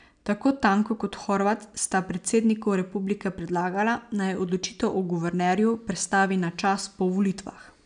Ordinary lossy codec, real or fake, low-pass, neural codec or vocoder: none; real; 9.9 kHz; none